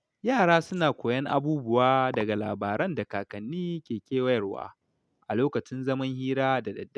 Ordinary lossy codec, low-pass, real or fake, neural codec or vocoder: none; none; real; none